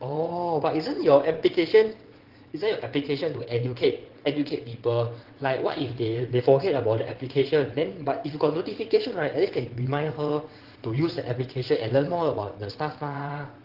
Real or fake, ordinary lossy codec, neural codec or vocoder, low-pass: fake; Opus, 16 kbps; vocoder, 22.05 kHz, 80 mel bands, WaveNeXt; 5.4 kHz